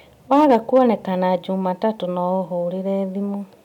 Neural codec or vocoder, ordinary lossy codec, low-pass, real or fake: none; none; 19.8 kHz; real